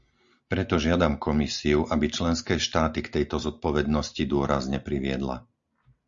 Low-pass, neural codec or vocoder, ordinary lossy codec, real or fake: 7.2 kHz; none; Opus, 64 kbps; real